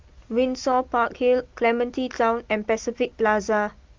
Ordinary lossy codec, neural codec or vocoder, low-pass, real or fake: Opus, 32 kbps; none; 7.2 kHz; real